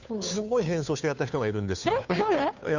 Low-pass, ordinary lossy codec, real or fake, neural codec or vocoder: 7.2 kHz; none; fake; codec, 16 kHz, 2 kbps, FunCodec, trained on Chinese and English, 25 frames a second